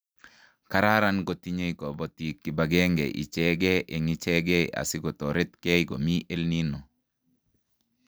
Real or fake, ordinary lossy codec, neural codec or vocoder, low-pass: real; none; none; none